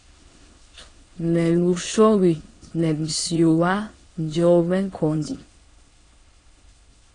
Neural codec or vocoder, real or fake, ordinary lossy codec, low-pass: autoencoder, 22.05 kHz, a latent of 192 numbers a frame, VITS, trained on many speakers; fake; AAC, 32 kbps; 9.9 kHz